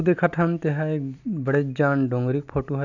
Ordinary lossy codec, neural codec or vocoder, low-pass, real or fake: none; none; 7.2 kHz; real